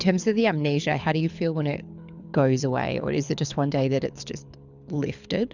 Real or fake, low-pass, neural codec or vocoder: fake; 7.2 kHz; codec, 24 kHz, 6 kbps, HILCodec